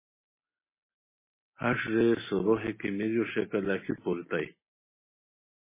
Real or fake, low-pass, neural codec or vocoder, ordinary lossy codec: real; 3.6 kHz; none; MP3, 16 kbps